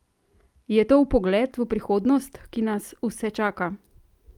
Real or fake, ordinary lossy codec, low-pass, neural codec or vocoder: real; Opus, 32 kbps; 19.8 kHz; none